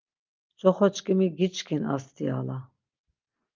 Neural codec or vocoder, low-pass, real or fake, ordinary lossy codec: none; 7.2 kHz; real; Opus, 24 kbps